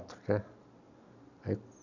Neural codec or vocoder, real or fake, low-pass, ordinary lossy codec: none; real; 7.2 kHz; none